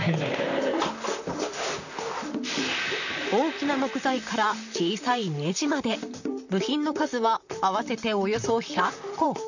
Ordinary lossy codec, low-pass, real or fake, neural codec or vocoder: none; 7.2 kHz; fake; vocoder, 44.1 kHz, 128 mel bands, Pupu-Vocoder